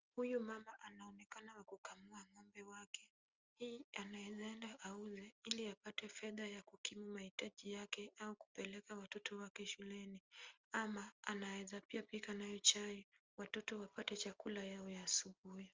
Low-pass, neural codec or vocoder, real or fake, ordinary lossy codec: 7.2 kHz; none; real; Opus, 32 kbps